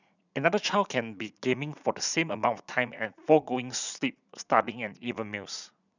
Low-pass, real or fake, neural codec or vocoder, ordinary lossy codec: 7.2 kHz; fake; vocoder, 44.1 kHz, 80 mel bands, Vocos; none